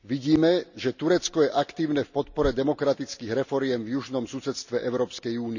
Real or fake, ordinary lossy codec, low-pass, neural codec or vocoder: real; none; 7.2 kHz; none